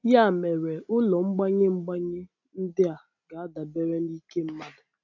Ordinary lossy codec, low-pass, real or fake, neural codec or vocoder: AAC, 48 kbps; 7.2 kHz; real; none